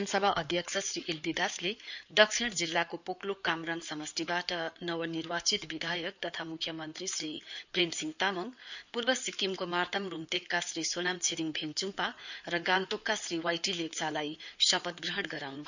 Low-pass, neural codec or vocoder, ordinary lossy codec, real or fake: 7.2 kHz; codec, 16 kHz in and 24 kHz out, 2.2 kbps, FireRedTTS-2 codec; none; fake